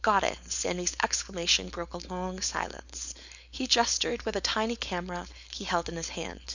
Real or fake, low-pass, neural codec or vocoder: fake; 7.2 kHz; codec, 16 kHz, 4.8 kbps, FACodec